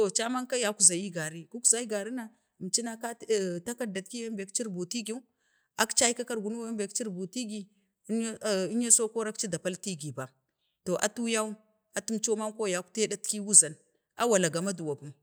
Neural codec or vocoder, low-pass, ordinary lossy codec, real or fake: none; none; none; real